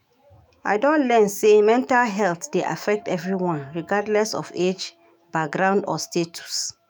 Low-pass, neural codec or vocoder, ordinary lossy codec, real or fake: none; autoencoder, 48 kHz, 128 numbers a frame, DAC-VAE, trained on Japanese speech; none; fake